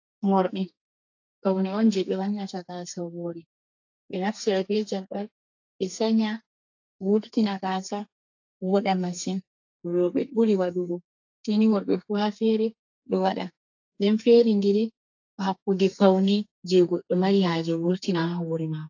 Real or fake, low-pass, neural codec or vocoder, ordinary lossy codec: fake; 7.2 kHz; codec, 32 kHz, 1.9 kbps, SNAC; AAC, 48 kbps